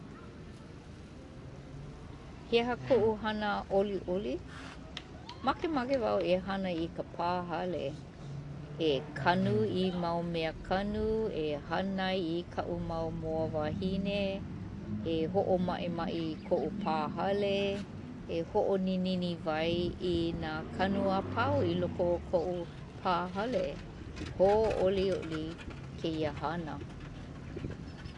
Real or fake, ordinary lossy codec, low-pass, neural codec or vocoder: real; AAC, 48 kbps; 10.8 kHz; none